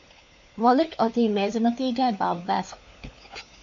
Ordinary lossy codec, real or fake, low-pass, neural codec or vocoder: MP3, 48 kbps; fake; 7.2 kHz; codec, 16 kHz, 4 kbps, FunCodec, trained on LibriTTS, 50 frames a second